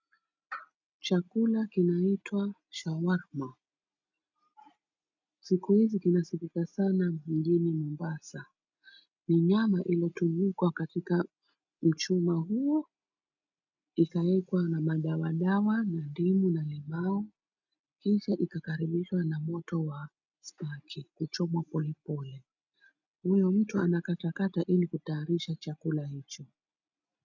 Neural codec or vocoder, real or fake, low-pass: none; real; 7.2 kHz